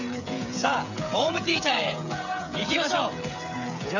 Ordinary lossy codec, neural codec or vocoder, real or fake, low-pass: none; codec, 16 kHz, 16 kbps, FreqCodec, smaller model; fake; 7.2 kHz